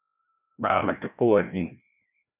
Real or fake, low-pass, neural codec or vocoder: fake; 3.6 kHz; codec, 16 kHz, 1 kbps, FreqCodec, larger model